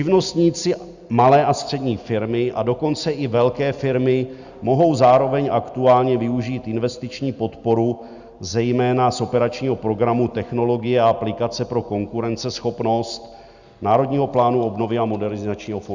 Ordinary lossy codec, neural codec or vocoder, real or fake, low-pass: Opus, 64 kbps; none; real; 7.2 kHz